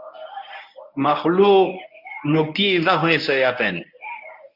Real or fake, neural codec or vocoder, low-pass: fake; codec, 24 kHz, 0.9 kbps, WavTokenizer, medium speech release version 1; 5.4 kHz